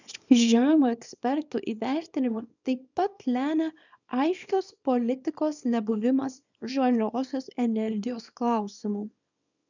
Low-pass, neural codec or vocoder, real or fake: 7.2 kHz; codec, 24 kHz, 0.9 kbps, WavTokenizer, small release; fake